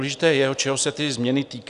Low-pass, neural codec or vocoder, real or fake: 10.8 kHz; none; real